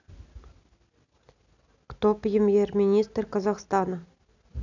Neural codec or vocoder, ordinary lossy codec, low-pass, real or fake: none; none; 7.2 kHz; real